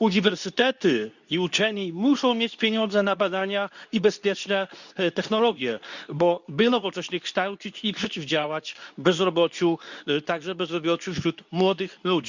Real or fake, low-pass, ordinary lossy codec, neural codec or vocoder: fake; 7.2 kHz; none; codec, 24 kHz, 0.9 kbps, WavTokenizer, medium speech release version 2